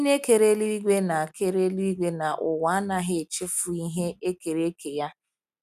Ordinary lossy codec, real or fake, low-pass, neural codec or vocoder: none; real; 14.4 kHz; none